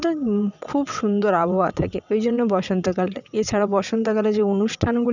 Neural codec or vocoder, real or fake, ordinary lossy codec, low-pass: vocoder, 22.05 kHz, 80 mel bands, WaveNeXt; fake; none; 7.2 kHz